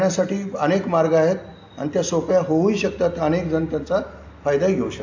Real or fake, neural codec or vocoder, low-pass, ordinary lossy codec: real; none; 7.2 kHz; AAC, 48 kbps